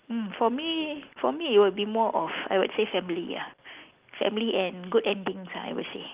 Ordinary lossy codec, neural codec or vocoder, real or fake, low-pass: Opus, 32 kbps; none; real; 3.6 kHz